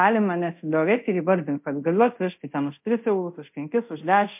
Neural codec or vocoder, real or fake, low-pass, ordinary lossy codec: codec, 24 kHz, 0.5 kbps, DualCodec; fake; 3.6 kHz; MP3, 32 kbps